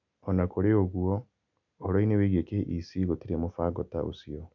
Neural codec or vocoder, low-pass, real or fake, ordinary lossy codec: none; 7.2 kHz; real; none